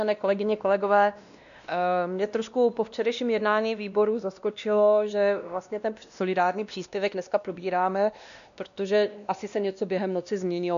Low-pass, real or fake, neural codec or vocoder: 7.2 kHz; fake; codec, 16 kHz, 1 kbps, X-Codec, WavLM features, trained on Multilingual LibriSpeech